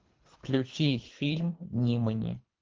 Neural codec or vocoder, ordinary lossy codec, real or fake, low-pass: codec, 24 kHz, 3 kbps, HILCodec; Opus, 32 kbps; fake; 7.2 kHz